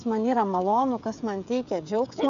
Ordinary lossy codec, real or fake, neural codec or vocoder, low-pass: AAC, 96 kbps; fake; codec, 16 kHz, 16 kbps, FreqCodec, smaller model; 7.2 kHz